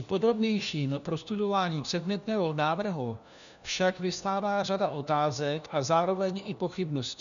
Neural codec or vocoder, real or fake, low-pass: codec, 16 kHz, 1 kbps, FunCodec, trained on LibriTTS, 50 frames a second; fake; 7.2 kHz